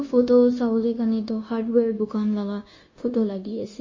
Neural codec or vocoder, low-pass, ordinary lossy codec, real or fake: codec, 16 kHz, 0.9 kbps, LongCat-Audio-Codec; 7.2 kHz; MP3, 32 kbps; fake